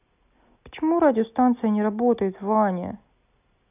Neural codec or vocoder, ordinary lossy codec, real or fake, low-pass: none; none; real; 3.6 kHz